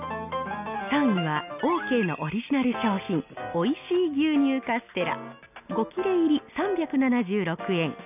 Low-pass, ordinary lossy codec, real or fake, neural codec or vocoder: 3.6 kHz; none; real; none